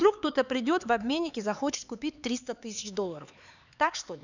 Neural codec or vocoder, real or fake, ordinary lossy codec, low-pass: codec, 16 kHz, 4 kbps, X-Codec, HuBERT features, trained on LibriSpeech; fake; none; 7.2 kHz